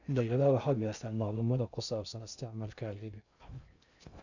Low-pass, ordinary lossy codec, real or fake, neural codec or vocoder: 7.2 kHz; none; fake; codec, 16 kHz in and 24 kHz out, 0.6 kbps, FocalCodec, streaming, 4096 codes